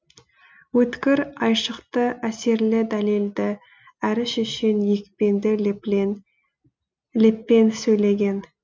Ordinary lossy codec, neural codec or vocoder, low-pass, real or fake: none; none; none; real